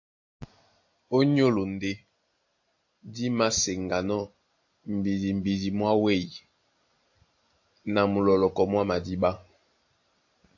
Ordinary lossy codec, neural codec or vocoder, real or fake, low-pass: AAC, 48 kbps; none; real; 7.2 kHz